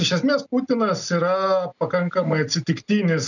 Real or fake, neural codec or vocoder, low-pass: real; none; 7.2 kHz